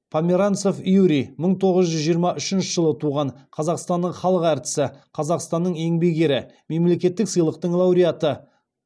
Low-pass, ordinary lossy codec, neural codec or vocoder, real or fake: none; none; none; real